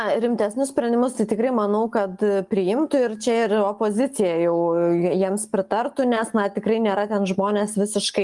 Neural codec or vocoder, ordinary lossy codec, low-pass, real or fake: none; Opus, 32 kbps; 10.8 kHz; real